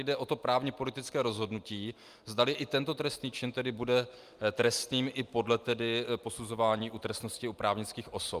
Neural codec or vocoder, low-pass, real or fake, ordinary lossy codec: none; 14.4 kHz; real; Opus, 32 kbps